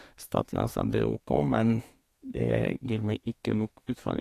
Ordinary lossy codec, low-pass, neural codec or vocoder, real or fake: AAC, 48 kbps; 14.4 kHz; codec, 32 kHz, 1.9 kbps, SNAC; fake